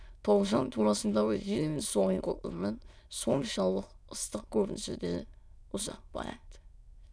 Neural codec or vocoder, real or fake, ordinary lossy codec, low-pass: autoencoder, 22.05 kHz, a latent of 192 numbers a frame, VITS, trained on many speakers; fake; none; none